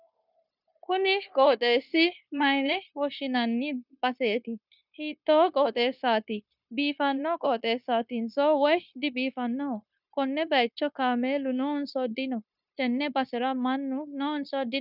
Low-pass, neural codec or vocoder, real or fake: 5.4 kHz; codec, 16 kHz, 0.9 kbps, LongCat-Audio-Codec; fake